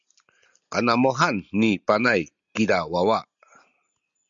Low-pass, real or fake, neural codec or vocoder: 7.2 kHz; real; none